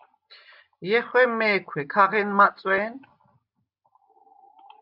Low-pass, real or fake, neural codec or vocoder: 5.4 kHz; real; none